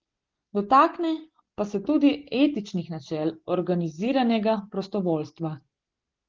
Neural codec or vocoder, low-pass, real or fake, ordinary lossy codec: none; 7.2 kHz; real; Opus, 16 kbps